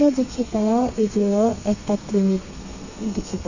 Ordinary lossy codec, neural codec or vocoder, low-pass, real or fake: none; codec, 32 kHz, 1.9 kbps, SNAC; 7.2 kHz; fake